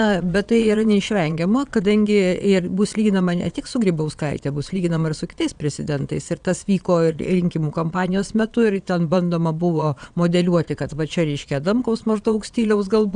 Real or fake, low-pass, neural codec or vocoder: fake; 9.9 kHz; vocoder, 22.05 kHz, 80 mel bands, Vocos